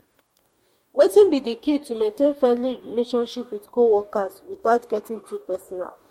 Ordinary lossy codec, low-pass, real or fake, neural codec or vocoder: MP3, 64 kbps; 19.8 kHz; fake; codec, 44.1 kHz, 2.6 kbps, DAC